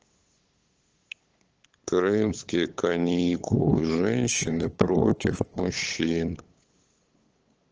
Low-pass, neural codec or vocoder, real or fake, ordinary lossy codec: 7.2 kHz; codec, 16 kHz, 8 kbps, FunCodec, trained on LibriTTS, 25 frames a second; fake; Opus, 16 kbps